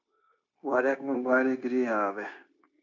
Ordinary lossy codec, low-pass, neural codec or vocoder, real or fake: MP3, 64 kbps; 7.2 kHz; codec, 16 kHz, 0.9 kbps, LongCat-Audio-Codec; fake